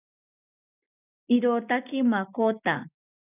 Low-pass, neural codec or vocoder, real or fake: 3.6 kHz; none; real